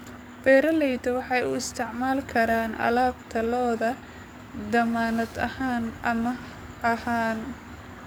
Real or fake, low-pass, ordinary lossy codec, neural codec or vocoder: fake; none; none; codec, 44.1 kHz, 7.8 kbps, DAC